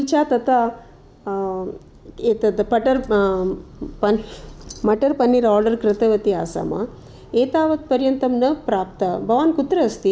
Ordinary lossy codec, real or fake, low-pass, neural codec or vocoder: none; real; none; none